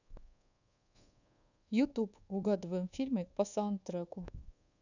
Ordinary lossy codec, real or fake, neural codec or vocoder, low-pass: none; fake; codec, 24 kHz, 1.2 kbps, DualCodec; 7.2 kHz